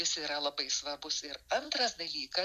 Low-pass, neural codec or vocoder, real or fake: 14.4 kHz; none; real